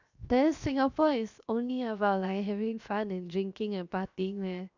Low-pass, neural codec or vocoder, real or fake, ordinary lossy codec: 7.2 kHz; codec, 16 kHz, 0.7 kbps, FocalCodec; fake; none